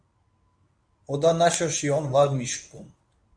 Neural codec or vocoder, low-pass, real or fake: codec, 24 kHz, 0.9 kbps, WavTokenizer, medium speech release version 1; 9.9 kHz; fake